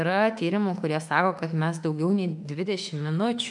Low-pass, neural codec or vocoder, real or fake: 10.8 kHz; autoencoder, 48 kHz, 32 numbers a frame, DAC-VAE, trained on Japanese speech; fake